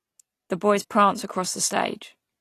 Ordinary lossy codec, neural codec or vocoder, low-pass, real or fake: AAC, 48 kbps; none; 14.4 kHz; real